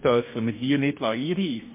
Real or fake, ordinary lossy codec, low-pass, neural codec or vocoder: fake; MP3, 24 kbps; 3.6 kHz; codec, 44.1 kHz, 2.6 kbps, DAC